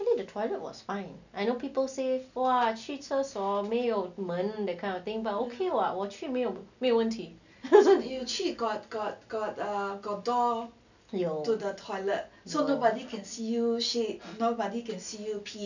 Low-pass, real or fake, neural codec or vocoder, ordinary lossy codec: 7.2 kHz; real; none; none